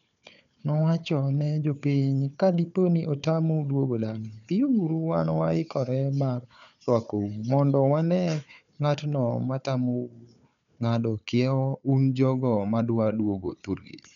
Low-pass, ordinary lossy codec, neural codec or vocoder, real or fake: 7.2 kHz; none; codec, 16 kHz, 4 kbps, FunCodec, trained on Chinese and English, 50 frames a second; fake